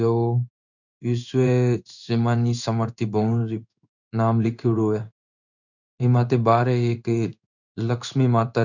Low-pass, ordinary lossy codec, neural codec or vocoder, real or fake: 7.2 kHz; none; codec, 16 kHz in and 24 kHz out, 1 kbps, XY-Tokenizer; fake